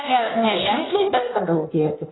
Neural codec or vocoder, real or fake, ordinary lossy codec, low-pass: codec, 16 kHz in and 24 kHz out, 1.1 kbps, FireRedTTS-2 codec; fake; AAC, 16 kbps; 7.2 kHz